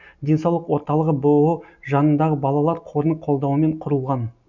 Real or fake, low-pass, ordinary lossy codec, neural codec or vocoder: real; 7.2 kHz; none; none